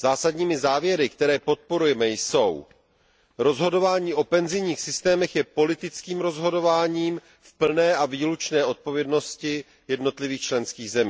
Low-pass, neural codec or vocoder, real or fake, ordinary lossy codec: none; none; real; none